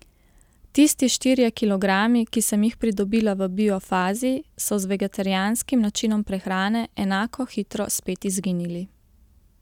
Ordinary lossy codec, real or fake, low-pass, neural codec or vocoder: none; real; 19.8 kHz; none